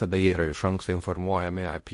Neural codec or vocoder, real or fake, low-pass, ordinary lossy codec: codec, 16 kHz in and 24 kHz out, 0.6 kbps, FocalCodec, streaming, 2048 codes; fake; 10.8 kHz; MP3, 48 kbps